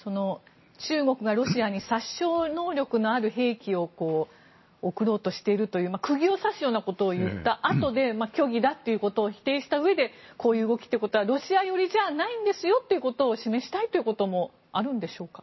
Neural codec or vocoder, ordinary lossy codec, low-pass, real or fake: none; MP3, 24 kbps; 7.2 kHz; real